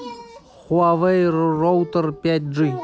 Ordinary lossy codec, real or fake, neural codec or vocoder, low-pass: none; real; none; none